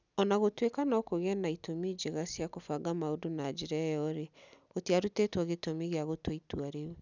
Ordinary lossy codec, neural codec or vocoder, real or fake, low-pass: none; none; real; 7.2 kHz